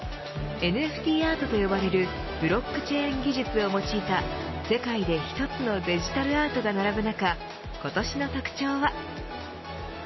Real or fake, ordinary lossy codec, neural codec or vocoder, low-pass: real; MP3, 24 kbps; none; 7.2 kHz